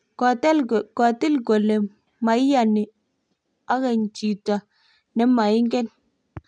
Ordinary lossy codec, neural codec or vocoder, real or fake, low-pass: none; none; real; 9.9 kHz